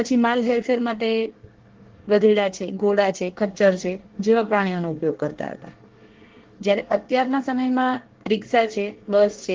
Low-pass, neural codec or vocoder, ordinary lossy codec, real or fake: 7.2 kHz; codec, 24 kHz, 1 kbps, SNAC; Opus, 16 kbps; fake